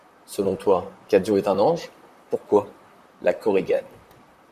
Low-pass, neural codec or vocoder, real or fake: 14.4 kHz; vocoder, 44.1 kHz, 128 mel bands, Pupu-Vocoder; fake